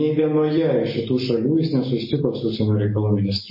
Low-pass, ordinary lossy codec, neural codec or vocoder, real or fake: 5.4 kHz; MP3, 24 kbps; none; real